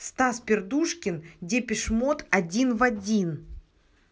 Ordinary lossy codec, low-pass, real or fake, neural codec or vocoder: none; none; real; none